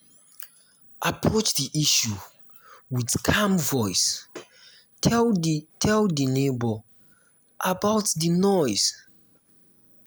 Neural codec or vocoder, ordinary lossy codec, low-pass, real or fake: none; none; none; real